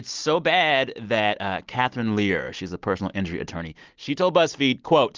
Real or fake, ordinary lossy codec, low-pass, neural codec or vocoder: real; Opus, 32 kbps; 7.2 kHz; none